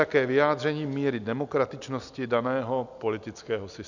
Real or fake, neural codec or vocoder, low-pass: real; none; 7.2 kHz